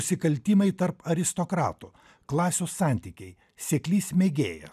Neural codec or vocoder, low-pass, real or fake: none; 14.4 kHz; real